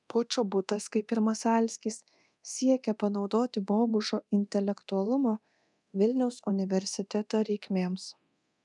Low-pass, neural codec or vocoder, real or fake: 10.8 kHz; codec, 24 kHz, 0.9 kbps, DualCodec; fake